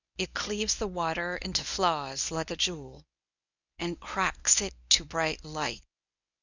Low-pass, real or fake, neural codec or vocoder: 7.2 kHz; fake; codec, 24 kHz, 0.9 kbps, WavTokenizer, medium speech release version 1